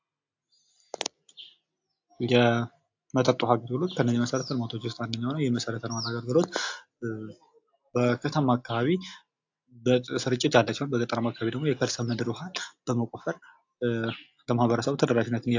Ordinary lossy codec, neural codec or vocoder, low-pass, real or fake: AAC, 48 kbps; none; 7.2 kHz; real